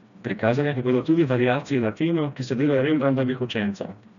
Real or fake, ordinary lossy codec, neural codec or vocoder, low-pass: fake; none; codec, 16 kHz, 1 kbps, FreqCodec, smaller model; 7.2 kHz